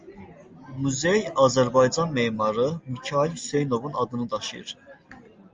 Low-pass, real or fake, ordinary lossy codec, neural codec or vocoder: 7.2 kHz; real; Opus, 32 kbps; none